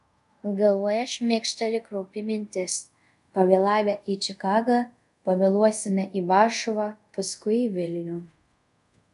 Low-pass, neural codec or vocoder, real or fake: 10.8 kHz; codec, 24 kHz, 0.5 kbps, DualCodec; fake